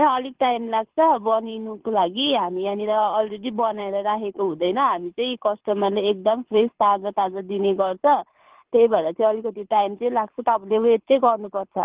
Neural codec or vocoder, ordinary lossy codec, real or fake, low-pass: codec, 24 kHz, 6 kbps, HILCodec; Opus, 16 kbps; fake; 3.6 kHz